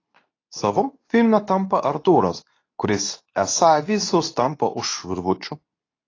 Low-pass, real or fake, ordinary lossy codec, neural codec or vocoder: 7.2 kHz; fake; AAC, 32 kbps; codec, 24 kHz, 0.9 kbps, WavTokenizer, medium speech release version 2